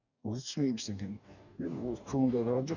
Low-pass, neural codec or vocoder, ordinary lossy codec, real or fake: 7.2 kHz; codec, 44.1 kHz, 2.6 kbps, DAC; none; fake